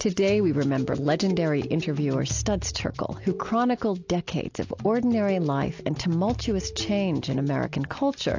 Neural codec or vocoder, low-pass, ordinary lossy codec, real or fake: none; 7.2 kHz; AAC, 48 kbps; real